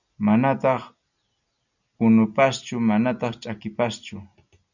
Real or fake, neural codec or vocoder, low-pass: real; none; 7.2 kHz